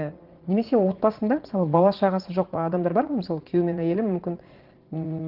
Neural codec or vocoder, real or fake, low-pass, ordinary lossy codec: vocoder, 44.1 kHz, 80 mel bands, Vocos; fake; 5.4 kHz; Opus, 16 kbps